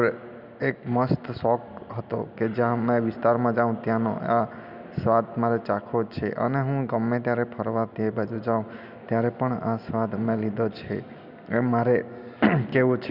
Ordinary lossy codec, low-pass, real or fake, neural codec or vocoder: none; 5.4 kHz; real; none